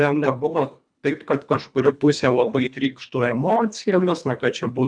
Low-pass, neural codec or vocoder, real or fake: 9.9 kHz; codec, 24 kHz, 1.5 kbps, HILCodec; fake